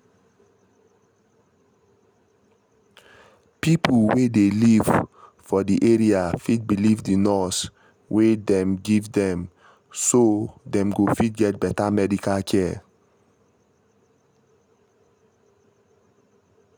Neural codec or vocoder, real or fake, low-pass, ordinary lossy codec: none; real; 19.8 kHz; none